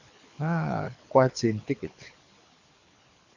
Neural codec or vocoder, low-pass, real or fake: codec, 24 kHz, 6 kbps, HILCodec; 7.2 kHz; fake